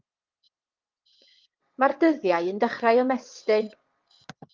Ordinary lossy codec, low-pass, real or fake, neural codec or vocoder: Opus, 32 kbps; 7.2 kHz; fake; vocoder, 22.05 kHz, 80 mel bands, WaveNeXt